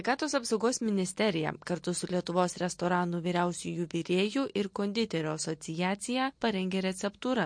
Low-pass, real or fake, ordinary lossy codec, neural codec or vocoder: 9.9 kHz; real; MP3, 48 kbps; none